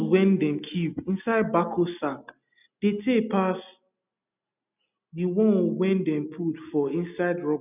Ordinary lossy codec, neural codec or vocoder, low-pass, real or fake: none; none; 3.6 kHz; real